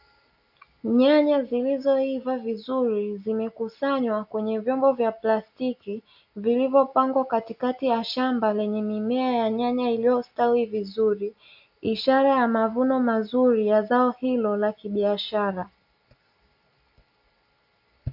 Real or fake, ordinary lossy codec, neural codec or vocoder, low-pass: real; AAC, 48 kbps; none; 5.4 kHz